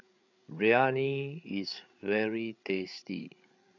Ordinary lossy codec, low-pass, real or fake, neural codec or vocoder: none; 7.2 kHz; fake; codec, 16 kHz, 8 kbps, FreqCodec, larger model